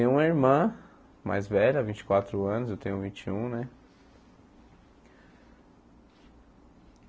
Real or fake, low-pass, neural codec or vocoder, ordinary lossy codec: real; none; none; none